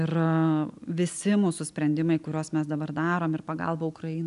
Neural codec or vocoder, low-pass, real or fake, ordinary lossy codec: none; 10.8 kHz; real; AAC, 96 kbps